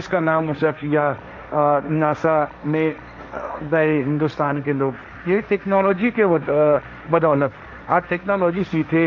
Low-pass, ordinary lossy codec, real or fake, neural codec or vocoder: none; none; fake; codec, 16 kHz, 1.1 kbps, Voila-Tokenizer